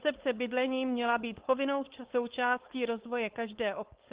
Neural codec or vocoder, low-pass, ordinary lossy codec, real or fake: codec, 16 kHz, 4.8 kbps, FACodec; 3.6 kHz; Opus, 16 kbps; fake